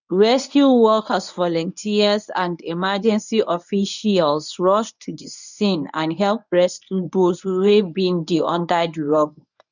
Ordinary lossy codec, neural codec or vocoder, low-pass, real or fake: none; codec, 24 kHz, 0.9 kbps, WavTokenizer, medium speech release version 1; 7.2 kHz; fake